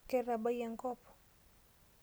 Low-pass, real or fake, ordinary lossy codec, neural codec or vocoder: none; real; none; none